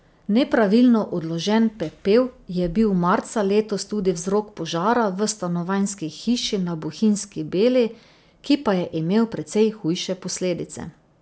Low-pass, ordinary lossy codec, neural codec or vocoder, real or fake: none; none; none; real